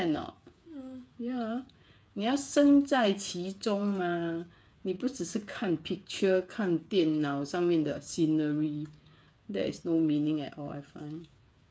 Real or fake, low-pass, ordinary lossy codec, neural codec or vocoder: fake; none; none; codec, 16 kHz, 8 kbps, FreqCodec, smaller model